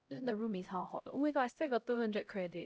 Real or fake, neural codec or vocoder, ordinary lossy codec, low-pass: fake; codec, 16 kHz, 0.5 kbps, X-Codec, HuBERT features, trained on LibriSpeech; none; none